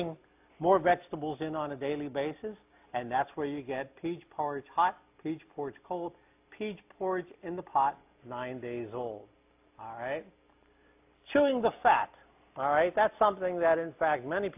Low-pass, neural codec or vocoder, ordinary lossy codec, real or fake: 3.6 kHz; none; AAC, 32 kbps; real